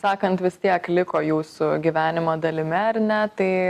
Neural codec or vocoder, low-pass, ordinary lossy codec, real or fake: none; 14.4 kHz; Opus, 64 kbps; real